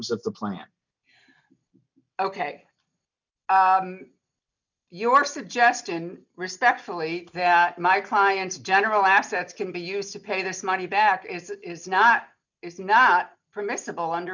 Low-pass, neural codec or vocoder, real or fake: 7.2 kHz; none; real